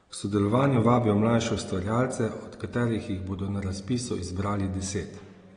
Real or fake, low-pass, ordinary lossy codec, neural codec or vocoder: real; 9.9 kHz; AAC, 32 kbps; none